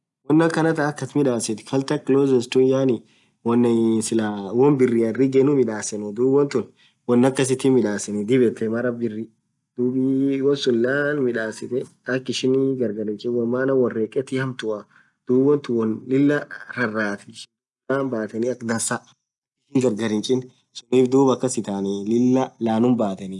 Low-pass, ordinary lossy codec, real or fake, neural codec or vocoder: 10.8 kHz; none; real; none